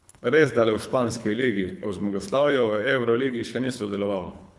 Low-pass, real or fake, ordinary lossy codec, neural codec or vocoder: none; fake; none; codec, 24 kHz, 3 kbps, HILCodec